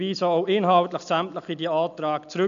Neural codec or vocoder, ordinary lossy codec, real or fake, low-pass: none; none; real; 7.2 kHz